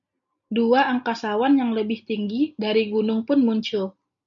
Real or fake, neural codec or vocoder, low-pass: real; none; 7.2 kHz